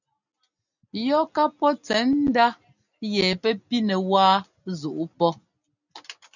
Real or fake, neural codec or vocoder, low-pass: real; none; 7.2 kHz